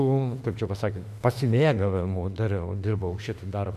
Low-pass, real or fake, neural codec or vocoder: 14.4 kHz; fake; autoencoder, 48 kHz, 32 numbers a frame, DAC-VAE, trained on Japanese speech